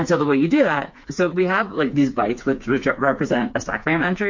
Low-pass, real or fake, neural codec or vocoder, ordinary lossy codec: 7.2 kHz; fake; codec, 16 kHz, 4 kbps, FreqCodec, smaller model; MP3, 48 kbps